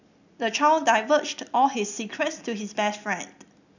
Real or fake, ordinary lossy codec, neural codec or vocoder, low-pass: real; none; none; 7.2 kHz